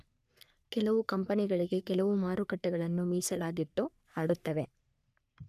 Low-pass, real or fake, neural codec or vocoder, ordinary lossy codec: 14.4 kHz; fake; codec, 44.1 kHz, 3.4 kbps, Pupu-Codec; none